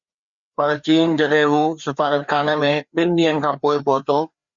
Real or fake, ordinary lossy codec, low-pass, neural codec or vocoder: fake; Opus, 64 kbps; 7.2 kHz; codec, 16 kHz, 2 kbps, FreqCodec, larger model